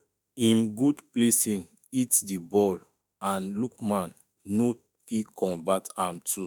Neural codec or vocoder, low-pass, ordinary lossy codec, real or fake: autoencoder, 48 kHz, 32 numbers a frame, DAC-VAE, trained on Japanese speech; none; none; fake